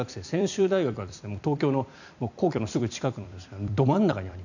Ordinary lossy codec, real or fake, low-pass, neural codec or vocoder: none; fake; 7.2 kHz; vocoder, 44.1 kHz, 128 mel bands every 256 samples, BigVGAN v2